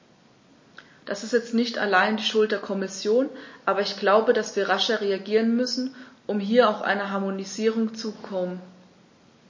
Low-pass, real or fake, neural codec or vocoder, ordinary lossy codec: 7.2 kHz; real; none; MP3, 32 kbps